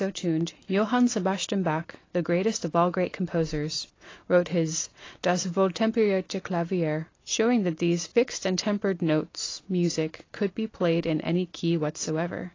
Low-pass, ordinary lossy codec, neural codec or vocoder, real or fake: 7.2 kHz; AAC, 32 kbps; none; real